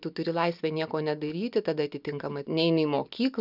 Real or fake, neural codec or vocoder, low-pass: real; none; 5.4 kHz